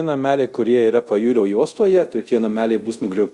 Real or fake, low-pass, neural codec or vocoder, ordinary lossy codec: fake; 10.8 kHz; codec, 24 kHz, 0.5 kbps, DualCodec; Opus, 64 kbps